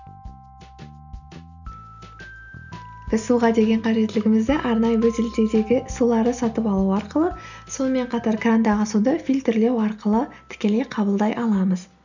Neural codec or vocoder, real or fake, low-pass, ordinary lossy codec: none; real; 7.2 kHz; none